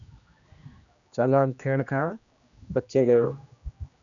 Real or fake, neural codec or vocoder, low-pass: fake; codec, 16 kHz, 1 kbps, X-Codec, HuBERT features, trained on general audio; 7.2 kHz